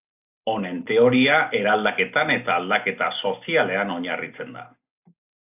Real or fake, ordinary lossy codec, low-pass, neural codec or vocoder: real; AAC, 32 kbps; 3.6 kHz; none